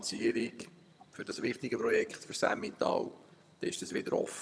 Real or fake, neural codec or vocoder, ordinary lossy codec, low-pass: fake; vocoder, 22.05 kHz, 80 mel bands, HiFi-GAN; none; none